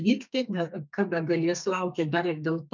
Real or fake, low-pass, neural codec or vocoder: fake; 7.2 kHz; codec, 32 kHz, 1.9 kbps, SNAC